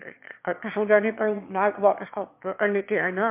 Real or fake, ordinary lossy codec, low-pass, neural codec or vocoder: fake; MP3, 32 kbps; 3.6 kHz; autoencoder, 22.05 kHz, a latent of 192 numbers a frame, VITS, trained on one speaker